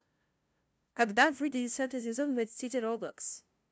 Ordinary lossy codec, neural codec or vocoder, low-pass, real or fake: none; codec, 16 kHz, 0.5 kbps, FunCodec, trained on LibriTTS, 25 frames a second; none; fake